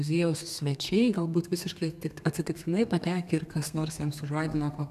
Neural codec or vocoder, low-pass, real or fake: codec, 32 kHz, 1.9 kbps, SNAC; 14.4 kHz; fake